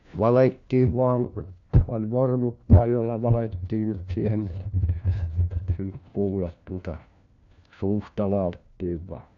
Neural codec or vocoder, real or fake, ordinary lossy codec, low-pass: codec, 16 kHz, 1 kbps, FunCodec, trained on LibriTTS, 50 frames a second; fake; none; 7.2 kHz